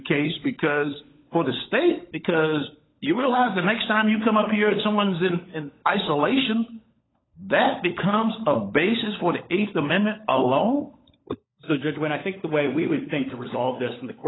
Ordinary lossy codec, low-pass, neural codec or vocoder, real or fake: AAC, 16 kbps; 7.2 kHz; codec, 16 kHz, 16 kbps, FunCodec, trained on LibriTTS, 50 frames a second; fake